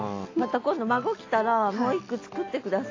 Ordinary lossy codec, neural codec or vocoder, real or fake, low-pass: AAC, 32 kbps; none; real; 7.2 kHz